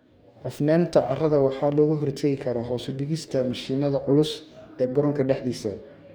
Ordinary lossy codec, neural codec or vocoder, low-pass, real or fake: none; codec, 44.1 kHz, 2.6 kbps, DAC; none; fake